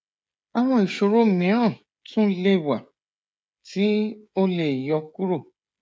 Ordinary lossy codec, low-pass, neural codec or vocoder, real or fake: none; none; codec, 16 kHz, 16 kbps, FreqCodec, smaller model; fake